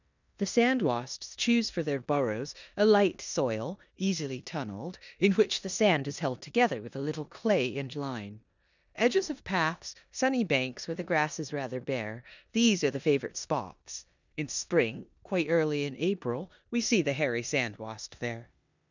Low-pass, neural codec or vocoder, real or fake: 7.2 kHz; codec, 16 kHz in and 24 kHz out, 0.9 kbps, LongCat-Audio-Codec, four codebook decoder; fake